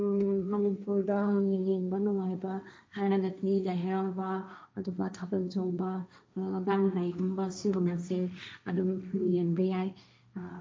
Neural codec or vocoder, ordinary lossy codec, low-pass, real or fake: codec, 16 kHz, 1.1 kbps, Voila-Tokenizer; none; none; fake